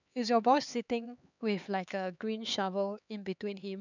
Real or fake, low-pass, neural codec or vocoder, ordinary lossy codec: fake; 7.2 kHz; codec, 16 kHz, 4 kbps, X-Codec, HuBERT features, trained on LibriSpeech; none